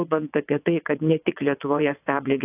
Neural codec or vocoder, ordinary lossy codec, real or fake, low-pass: vocoder, 22.05 kHz, 80 mel bands, WaveNeXt; AAC, 32 kbps; fake; 3.6 kHz